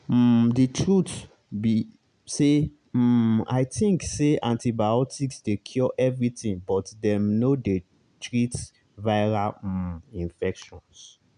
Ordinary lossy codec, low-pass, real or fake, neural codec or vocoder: none; 9.9 kHz; real; none